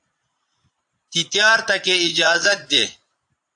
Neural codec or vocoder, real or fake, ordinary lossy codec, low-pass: vocoder, 22.05 kHz, 80 mel bands, Vocos; fake; AAC, 64 kbps; 9.9 kHz